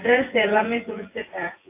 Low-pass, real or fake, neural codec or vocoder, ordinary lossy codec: 3.6 kHz; fake; vocoder, 24 kHz, 100 mel bands, Vocos; AAC, 24 kbps